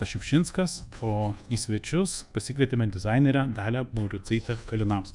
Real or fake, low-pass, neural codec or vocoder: fake; 10.8 kHz; codec, 24 kHz, 1.2 kbps, DualCodec